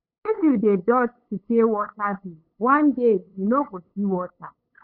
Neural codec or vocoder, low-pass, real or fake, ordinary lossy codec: codec, 16 kHz, 8 kbps, FunCodec, trained on LibriTTS, 25 frames a second; 5.4 kHz; fake; none